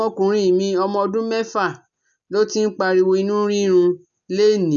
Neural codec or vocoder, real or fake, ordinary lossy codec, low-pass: none; real; none; 7.2 kHz